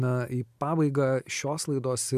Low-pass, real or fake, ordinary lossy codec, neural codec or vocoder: 14.4 kHz; real; MP3, 96 kbps; none